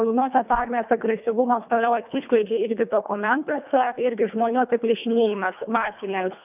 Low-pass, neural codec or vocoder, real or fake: 3.6 kHz; codec, 24 kHz, 1.5 kbps, HILCodec; fake